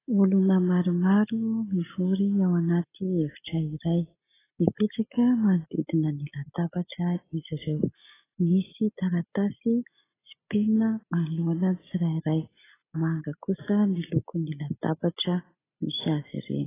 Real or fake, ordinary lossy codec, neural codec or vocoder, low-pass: real; AAC, 16 kbps; none; 3.6 kHz